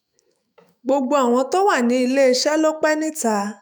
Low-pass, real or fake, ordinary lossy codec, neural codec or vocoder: none; fake; none; autoencoder, 48 kHz, 128 numbers a frame, DAC-VAE, trained on Japanese speech